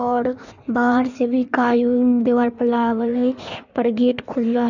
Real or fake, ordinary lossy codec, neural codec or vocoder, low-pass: fake; none; codec, 16 kHz in and 24 kHz out, 1.1 kbps, FireRedTTS-2 codec; 7.2 kHz